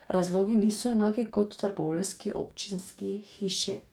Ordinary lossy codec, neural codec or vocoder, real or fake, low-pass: none; codec, 44.1 kHz, 2.6 kbps, DAC; fake; 19.8 kHz